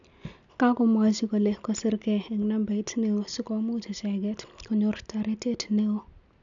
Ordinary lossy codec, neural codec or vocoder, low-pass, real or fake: none; none; 7.2 kHz; real